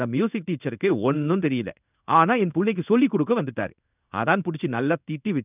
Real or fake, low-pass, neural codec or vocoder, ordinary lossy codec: fake; 3.6 kHz; codec, 16 kHz in and 24 kHz out, 1 kbps, XY-Tokenizer; none